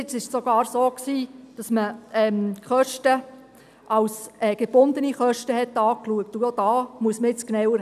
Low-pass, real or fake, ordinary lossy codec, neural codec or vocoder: 14.4 kHz; real; none; none